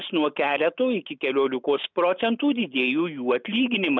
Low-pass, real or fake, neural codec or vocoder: 7.2 kHz; real; none